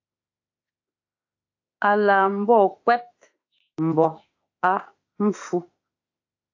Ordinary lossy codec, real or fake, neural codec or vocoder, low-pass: AAC, 48 kbps; fake; autoencoder, 48 kHz, 32 numbers a frame, DAC-VAE, trained on Japanese speech; 7.2 kHz